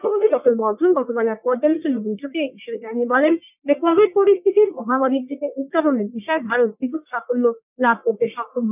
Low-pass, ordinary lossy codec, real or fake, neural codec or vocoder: 3.6 kHz; none; fake; codec, 44.1 kHz, 1.7 kbps, Pupu-Codec